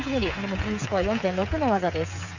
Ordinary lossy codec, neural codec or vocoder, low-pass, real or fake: none; codec, 16 kHz, 8 kbps, FreqCodec, smaller model; 7.2 kHz; fake